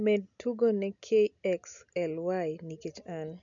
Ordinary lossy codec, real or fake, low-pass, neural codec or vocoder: none; real; 7.2 kHz; none